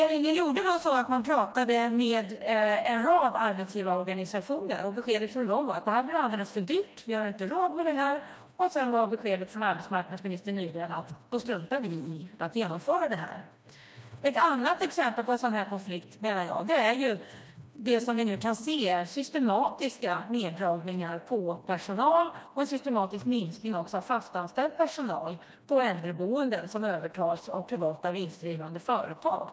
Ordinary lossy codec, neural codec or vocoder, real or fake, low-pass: none; codec, 16 kHz, 1 kbps, FreqCodec, smaller model; fake; none